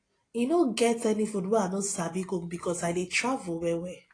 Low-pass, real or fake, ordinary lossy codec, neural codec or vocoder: 9.9 kHz; real; AAC, 32 kbps; none